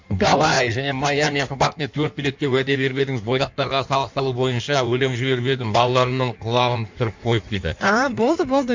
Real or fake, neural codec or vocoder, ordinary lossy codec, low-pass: fake; codec, 16 kHz in and 24 kHz out, 1.1 kbps, FireRedTTS-2 codec; MP3, 64 kbps; 7.2 kHz